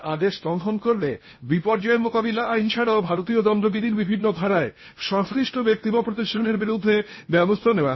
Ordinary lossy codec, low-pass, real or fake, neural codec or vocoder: MP3, 24 kbps; 7.2 kHz; fake; codec, 16 kHz in and 24 kHz out, 0.8 kbps, FocalCodec, streaming, 65536 codes